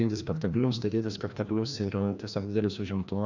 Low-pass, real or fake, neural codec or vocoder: 7.2 kHz; fake; codec, 16 kHz, 1 kbps, FreqCodec, larger model